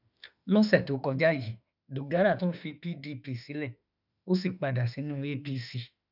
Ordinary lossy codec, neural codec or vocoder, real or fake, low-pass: none; autoencoder, 48 kHz, 32 numbers a frame, DAC-VAE, trained on Japanese speech; fake; 5.4 kHz